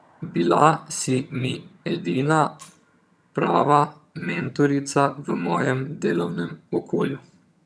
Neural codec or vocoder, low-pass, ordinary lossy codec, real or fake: vocoder, 22.05 kHz, 80 mel bands, HiFi-GAN; none; none; fake